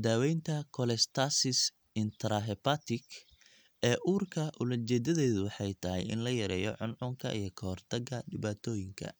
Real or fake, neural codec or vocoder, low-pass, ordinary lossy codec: real; none; none; none